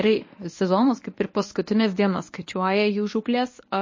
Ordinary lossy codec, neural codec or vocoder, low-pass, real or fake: MP3, 32 kbps; codec, 24 kHz, 0.9 kbps, WavTokenizer, medium speech release version 1; 7.2 kHz; fake